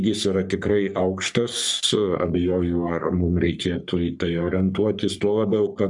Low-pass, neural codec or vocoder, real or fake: 10.8 kHz; codec, 44.1 kHz, 3.4 kbps, Pupu-Codec; fake